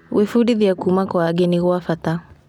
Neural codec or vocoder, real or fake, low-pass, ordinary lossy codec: none; real; 19.8 kHz; none